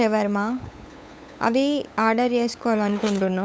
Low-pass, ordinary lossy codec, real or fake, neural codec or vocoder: none; none; fake; codec, 16 kHz, 8 kbps, FunCodec, trained on LibriTTS, 25 frames a second